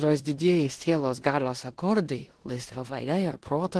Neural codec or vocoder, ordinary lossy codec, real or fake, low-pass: codec, 16 kHz in and 24 kHz out, 0.9 kbps, LongCat-Audio-Codec, four codebook decoder; Opus, 16 kbps; fake; 10.8 kHz